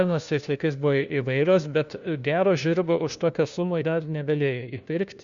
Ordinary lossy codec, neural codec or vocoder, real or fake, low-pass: Opus, 64 kbps; codec, 16 kHz, 1 kbps, FunCodec, trained on LibriTTS, 50 frames a second; fake; 7.2 kHz